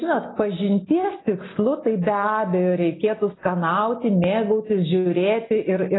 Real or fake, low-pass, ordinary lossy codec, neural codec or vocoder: real; 7.2 kHz; AAC, 16 kbps; none